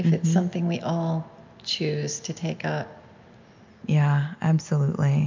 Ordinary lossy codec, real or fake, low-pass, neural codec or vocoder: MP3, 64 kbps; real; 7.2 kHz; none